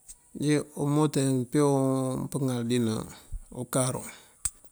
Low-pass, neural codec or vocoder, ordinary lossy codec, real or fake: none; none; none; real